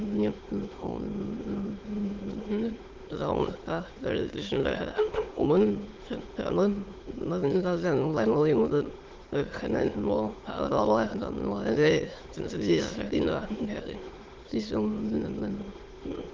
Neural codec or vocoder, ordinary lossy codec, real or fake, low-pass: autoencoder, 22.05 kHz, a latent of 192 numbers a frame, VITS, trained on many speakers; Opus, 16 kbps; fake; 7.2 kHz